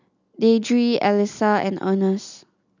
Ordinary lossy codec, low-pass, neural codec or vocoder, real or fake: none; 7.2 kHz; none; real